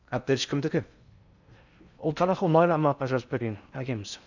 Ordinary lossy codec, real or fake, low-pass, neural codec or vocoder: none; fake; 7.2 kHz; codec, 16 kHz in and 24 kHz out, 0.6 kbps, FocalCodec, streaming, 4096 codes